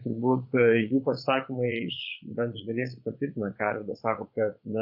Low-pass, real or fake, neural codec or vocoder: 5.4 kHz; fake; vocoder, 22.05 kHz, 80 mel bands, Vocos